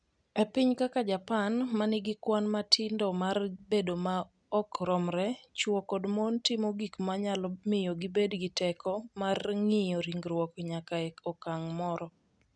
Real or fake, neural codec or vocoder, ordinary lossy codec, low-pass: real; none; none; 9.9 kHz